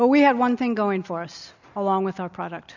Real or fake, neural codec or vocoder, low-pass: real; none; 7.2 kHz